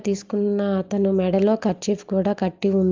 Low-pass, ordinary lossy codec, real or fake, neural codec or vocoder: 7.2 kHz; Opus, 24 kbps; real; none